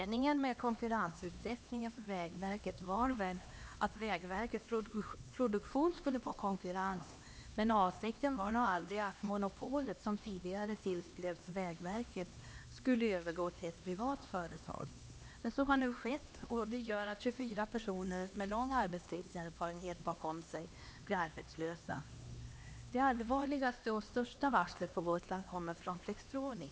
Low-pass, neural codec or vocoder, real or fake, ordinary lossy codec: none; codec, 16 kHz, 2 kbps, X-Codec, HuBERT features, trained on LibriSpeech; fake; none